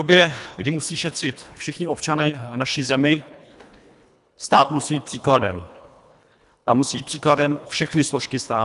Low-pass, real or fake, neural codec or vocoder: 10.8 kHz; fake; codec, 24 kHz, 1.5 kbps, HILCodec